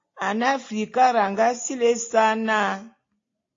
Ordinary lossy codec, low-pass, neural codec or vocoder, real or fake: AAC, 32 kbps; 7.2 kHz; none; real